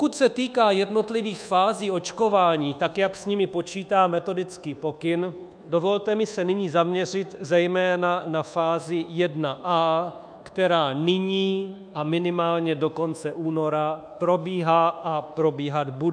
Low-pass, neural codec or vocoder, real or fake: 9.9 kHz; codec, 24 kHz, 1.2 kbps, DualCodec; fake